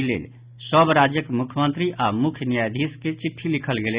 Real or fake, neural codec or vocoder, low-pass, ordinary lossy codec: real; none; 3.6 kHz; Opus, 64 kbps